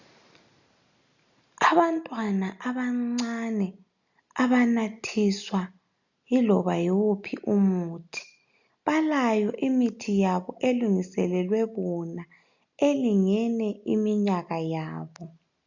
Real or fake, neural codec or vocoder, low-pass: real; none; 7.2 kHz